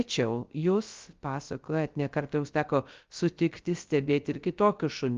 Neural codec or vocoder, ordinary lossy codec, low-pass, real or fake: codec, 16 kHz, 0.3 kbps, FocalCodec; Opus, 32 kbps; 7.2 kHz; fake